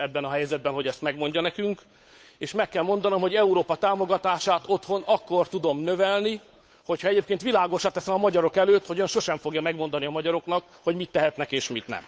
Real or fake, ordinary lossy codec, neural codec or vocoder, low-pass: fake; none; codec, 16 kHz, 8 kbps, FunCodec, trained on Chinese and English, 25 frames a second; none